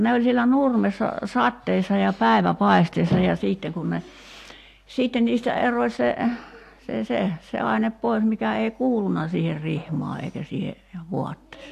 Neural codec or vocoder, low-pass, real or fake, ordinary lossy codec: none; 14.4 kHz; real; AAC, 64 kbps